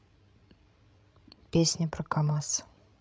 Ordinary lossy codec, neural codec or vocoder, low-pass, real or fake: none; codec, 16 kHz, 16 kbps, FreqCodec, larger model; none; fake